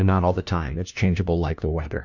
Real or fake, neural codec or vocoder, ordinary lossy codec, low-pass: fake; codec, 16 kHz, 1 kbps, FunCodec, trained on LibriTTS, 50 frames a second; MP3, 64 kbps; 7.2 kHz